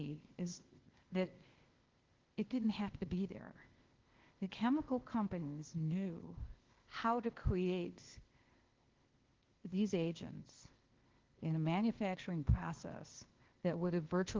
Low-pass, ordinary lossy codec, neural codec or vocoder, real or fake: 7.2 kHz; Opus, 16 kbps; codec, 16 kHz, 0.8 kbps, ZipCodec; fake